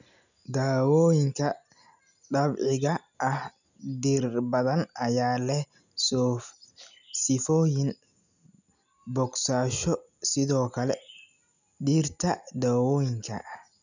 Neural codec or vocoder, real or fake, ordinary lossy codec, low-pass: none; real; none; 7.2 kHz